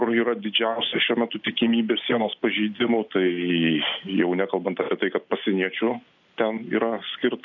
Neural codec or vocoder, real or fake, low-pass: none; real; 7.2 kHz